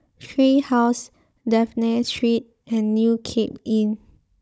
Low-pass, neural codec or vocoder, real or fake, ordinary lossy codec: none; codec, 16 kHz, 16 kbps, FunCodec, trained on Chinese and English, 50 frames a second; fake; none